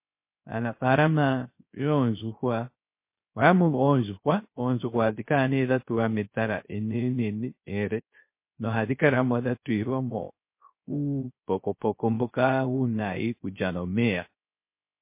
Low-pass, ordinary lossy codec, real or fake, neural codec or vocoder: 3.6 kHz; MP3, 24 kbps; fake; codec, 16 kHz, 0.3 kbps, FocalCodec